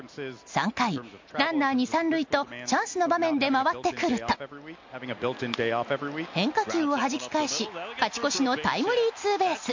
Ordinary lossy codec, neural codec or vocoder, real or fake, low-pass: MP3, 48 kbps; none; real; 7.2 kHz